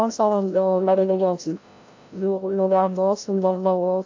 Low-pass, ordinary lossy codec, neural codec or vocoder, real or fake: 7.2 kHz; AAC, 48 kbps; codec, 16 kHz, 0.5 kbps, FreqCodec, larger model; fake